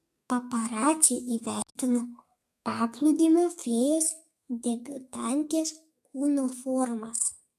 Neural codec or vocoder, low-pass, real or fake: codec, 32 kHz, 1.9 kbps, SNAC; 14.4 kHz; fake